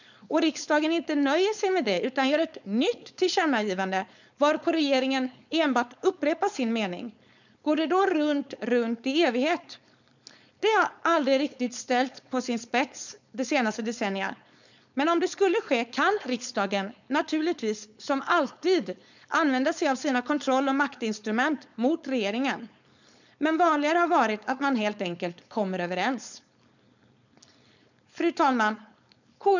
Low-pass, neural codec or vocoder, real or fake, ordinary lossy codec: 7.2 kHz; codec, 16 kHz, 4.8 kbps, FACodec; fake; none